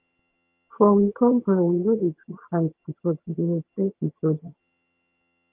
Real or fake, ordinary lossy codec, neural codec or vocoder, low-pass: fake; Opus, 24 kbps; vocoder, 22.05 kHz, 80 mel bands, HiFi-GAN; 3.6 kHz